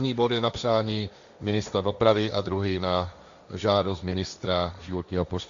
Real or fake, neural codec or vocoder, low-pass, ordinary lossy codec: fake; codec, 16 kHz, 1.1 kbps, Voila-Tokenizer; 7.2 kHz; Opus, 64 kbps